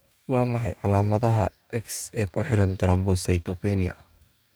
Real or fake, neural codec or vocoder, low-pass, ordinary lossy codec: fake; codec, 44.1 kHz, 2.6 kbps, DAC; none; none